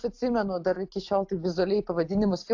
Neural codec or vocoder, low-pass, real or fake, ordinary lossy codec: none; 7.2 kHz; real; AAC, 48 kbps